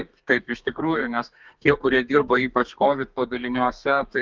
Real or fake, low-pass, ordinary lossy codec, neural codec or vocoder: fake; 7.2 kHz; Opus, 24 kbps; codec, 32 kHz, 1.9 kbps, SNAC